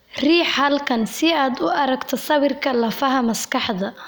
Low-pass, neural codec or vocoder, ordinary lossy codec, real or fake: none; none; none; real